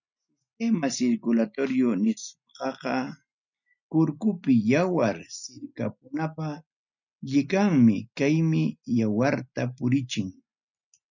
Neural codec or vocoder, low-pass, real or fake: none; 7.2 kHz; real